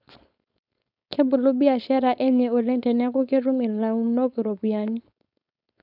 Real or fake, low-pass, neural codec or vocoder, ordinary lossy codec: fake; 5.4 kHz; codec, 16 kHz, 4.8 kbps, FACodec; none